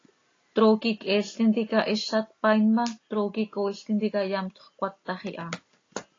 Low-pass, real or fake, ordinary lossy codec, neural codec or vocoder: 7.2 kHz; real; AAC, 32 kbps; none